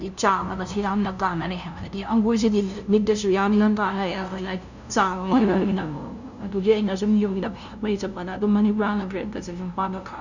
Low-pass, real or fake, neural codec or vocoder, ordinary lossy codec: 7.2 kHz; fake; codec, 16 kHz, 0.5 kbps, FunCodec, trained on LibriTTS, 25 frames a second; none